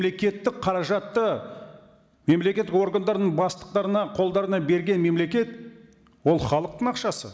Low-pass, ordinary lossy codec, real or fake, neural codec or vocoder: none; none; real; none